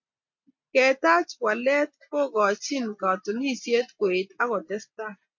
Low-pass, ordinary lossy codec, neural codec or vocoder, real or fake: 7.2 kHz; MP3, 48 kbps; none; real